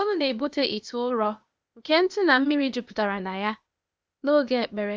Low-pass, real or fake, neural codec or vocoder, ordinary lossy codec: none; fake; codec, 16 kHz, 0.8 kbps, ZipCodec; none